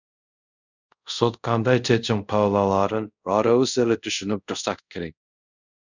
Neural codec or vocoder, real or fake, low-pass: codec, 24 kHz, 0.5 kbps, DualCodec; fake; 7.2 kHz